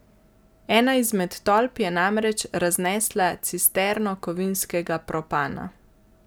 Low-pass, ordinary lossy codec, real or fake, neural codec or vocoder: none; none; real; none